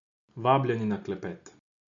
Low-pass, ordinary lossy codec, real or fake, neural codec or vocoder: 7.2 kHz; none; real; none